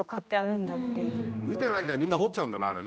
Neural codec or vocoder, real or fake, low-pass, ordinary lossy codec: codec, 16 kHz, 1 kbps, X-Codec, HuBERT features, trained on general audio; fake; none; none